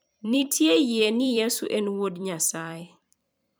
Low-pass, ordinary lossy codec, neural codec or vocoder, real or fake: none; none; vocoder, 44.1 kHz, 128 mel bands every 256 samples, BigVGAN v2; fake